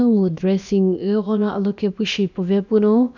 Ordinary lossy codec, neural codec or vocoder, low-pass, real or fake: none; codec, 16 kHz, about 1 kbps, DyCAST, with the encoder's durations; 7.2 kHz; fake